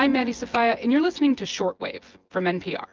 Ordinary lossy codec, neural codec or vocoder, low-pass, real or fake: Opus, 24 kbps; vocoder, 24 kHz, 100 mel bands, Vocos; 7.2 kHz; fake